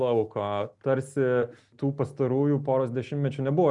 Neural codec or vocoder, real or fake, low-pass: none; real; 10.8 kHz